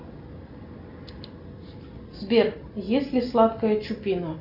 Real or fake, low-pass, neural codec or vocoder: real; 5.4 kHz; none